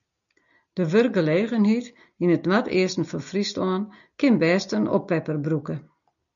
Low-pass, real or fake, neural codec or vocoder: 7.2 kHz; real; none